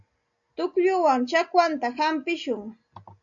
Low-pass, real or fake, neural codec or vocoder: 7.2 kHz; real; none